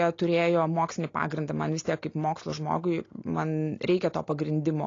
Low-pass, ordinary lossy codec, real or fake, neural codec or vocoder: 7.2 kHz; AAC, 32 kbps; real; none